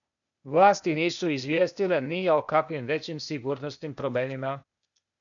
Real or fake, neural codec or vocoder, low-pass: fake; codec, 16 kHz, 0.8 kbps, ZipCodec; 7.2 kHz